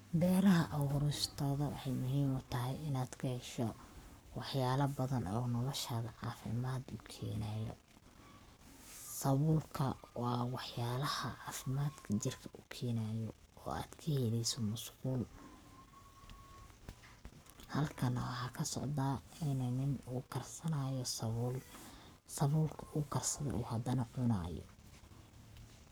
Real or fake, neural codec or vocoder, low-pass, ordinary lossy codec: fake; codec, 44.1 kHz, 7.8 kbps, Pupu-Codec; none; none